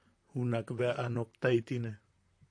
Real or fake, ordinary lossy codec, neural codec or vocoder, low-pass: fake; AAC, 48 kbps; vocoder, 44.1 kHz, 128 mel bands, Pupu-Vocoder; 9.9 kHz